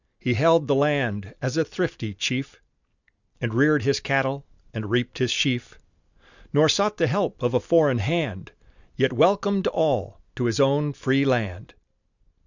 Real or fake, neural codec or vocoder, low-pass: real; none; 7.2 kHz